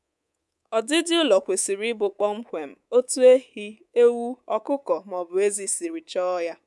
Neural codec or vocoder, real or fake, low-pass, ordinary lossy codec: codec, 24 kHz, 3.1 kbps, DualCodec; fake; none; none